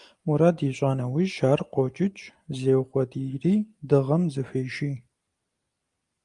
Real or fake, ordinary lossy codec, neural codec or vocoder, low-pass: real; Opus, 32 kbps; none; 10.8 kHz